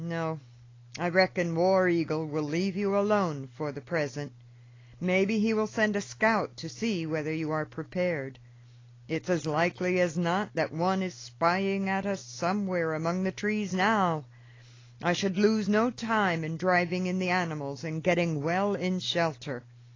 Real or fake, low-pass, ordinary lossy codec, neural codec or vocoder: real; 7.2 kHz; AAC, 32 kbps; none